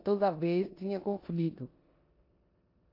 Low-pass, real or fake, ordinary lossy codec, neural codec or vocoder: 5.4 kHz; fake; AAC, 32 kbps; codec, 16 kHz in and 24 kHz out, 0.9 kbps, LongCat-Audio-Codec, four codebook decoder